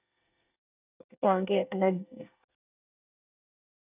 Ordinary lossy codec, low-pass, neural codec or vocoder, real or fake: none; 3.6 kHz; codec, 24 kHz, 1 kbps, SNAC; fake